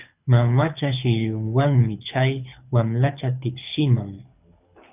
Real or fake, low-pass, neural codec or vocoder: fake; 3.6 kHz; codec, 24 kHz, 6 kbps, HILCodec